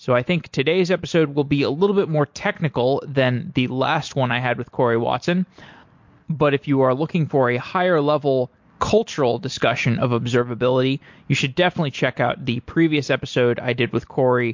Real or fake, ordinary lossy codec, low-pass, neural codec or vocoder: real; MP3, 48 kbps; 7.2 kHz; none